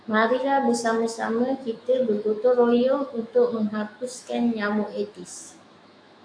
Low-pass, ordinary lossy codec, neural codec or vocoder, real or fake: 9.9 kHz; AAC, 64 kbps; autoencoder, 48 kHz, 128 numbers a frame, DAC-VAE, trained on Japanese speech; fake